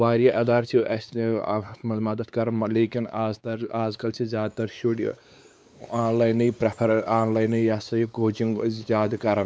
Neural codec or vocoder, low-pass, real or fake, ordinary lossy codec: codec, 16 kHz, 4 kbps, X-Codec, WavLM features, trained on Multilingual LibriSpeech; none; fake; none